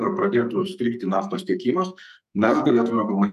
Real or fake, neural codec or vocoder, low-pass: fake; codec, 44.1 kHz, 2.6 kbps, SNAC; 14.4 kHz